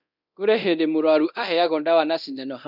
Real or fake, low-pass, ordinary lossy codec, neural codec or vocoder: fake; 5.4 kHz; none; codec, 24 kHz, 0.9 kbps, DualCodec